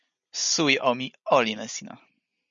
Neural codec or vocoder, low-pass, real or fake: none; 7.2 kHz; real